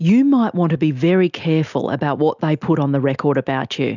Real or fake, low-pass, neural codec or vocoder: real; 7.2 kHz; none